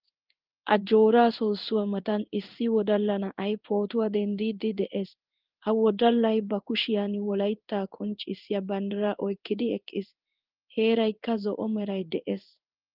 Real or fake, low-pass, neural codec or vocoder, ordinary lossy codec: fake; 5.4 kHz; codec, 16 kHz in and 24 kHz out, 1 kbps, XY-Tokenizer; Opus, 32 kbps